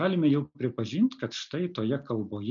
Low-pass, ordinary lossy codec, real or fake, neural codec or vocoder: 7.2 kHz; MP3, 64 kbps; real; none